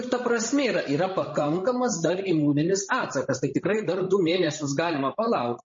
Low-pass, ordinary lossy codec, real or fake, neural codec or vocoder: 7.2 kHz; MP3, 32 kbps; fake; codec, 16 kHz, 16 kbps, FreqCodec, larger model